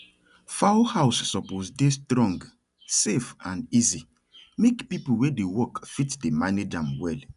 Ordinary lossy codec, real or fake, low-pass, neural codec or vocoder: none; real; 10.8 kHz; none